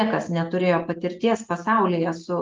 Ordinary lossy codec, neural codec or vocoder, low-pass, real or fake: Opus, 32 kbps; none; 7.2 kHz; real